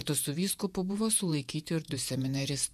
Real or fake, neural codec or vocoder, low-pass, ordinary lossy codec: fake; vocoder, 48 kHz, 128 mel bands, Vocos; 14.4 kHz; MP3, 96 kbps